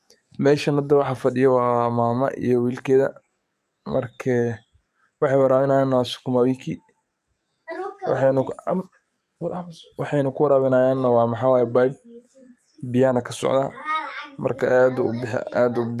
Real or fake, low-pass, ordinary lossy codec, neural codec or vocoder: fake; 14.4 kHz; none; codec, 44.1 kHz, 7.8 kbps, DAC